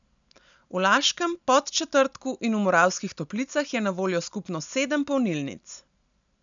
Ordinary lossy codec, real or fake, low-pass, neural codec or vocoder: none; real; 7.2 kHz; none